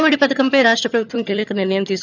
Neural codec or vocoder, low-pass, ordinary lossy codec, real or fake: vocoder, 22.05 kHz, 80 mel bands, HiFi-GAN; 7.2 kHz; none; fake